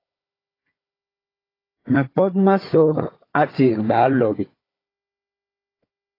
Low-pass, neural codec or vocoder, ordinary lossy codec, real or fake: 5.4 kHz; codec, 16 kHz, 4 kbps, FunCodec, trained on Chinese and English, 50 frames a second; AAC, 24 kbps; fake